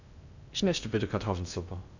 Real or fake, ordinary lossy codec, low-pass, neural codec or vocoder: fake; none; 7.2 kHz; codec, 16 kHz in and 24 kHz out, 0.6 kbps, FocalCodec, streaming, 2048 codes